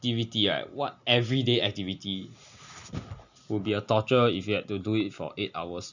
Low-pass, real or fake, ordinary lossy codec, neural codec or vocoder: 7.2 kHz; real; none; none